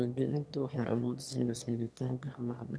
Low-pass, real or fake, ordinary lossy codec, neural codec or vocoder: none; fake; none; autoencoder, 22.05 kHz, a latent of 192 numbers a frame, VITS, trained on one speaker